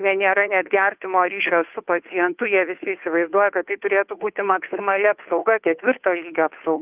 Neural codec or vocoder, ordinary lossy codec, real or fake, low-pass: codec, 16 kHz, 2 kbps, FunCodec, trained on Chinese and English, 25 frames a second; Opus, 24 kbps; fake; 3.6 kHz